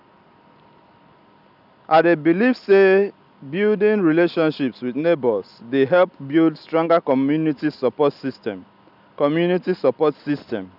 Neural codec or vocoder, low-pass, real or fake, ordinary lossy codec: none; 5.4 kHz; real; none